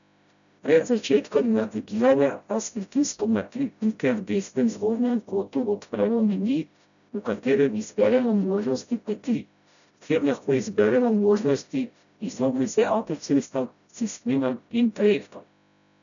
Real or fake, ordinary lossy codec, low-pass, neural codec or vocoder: fake; none; 7.2 kHz; codec, 16 kHz, 0.5 kbps, FreqCodec, smaller model